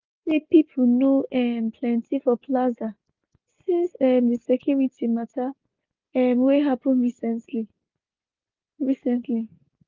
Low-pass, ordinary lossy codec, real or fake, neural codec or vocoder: 7.2 kHz; Opus, 32 kbps; real; none